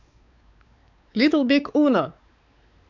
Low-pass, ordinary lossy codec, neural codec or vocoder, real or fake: 7.2 kHz; none; codec, 16 kHz, 4 kbps, X-Codec, WavLM features, trained on Multilingual LibriSpeech; fake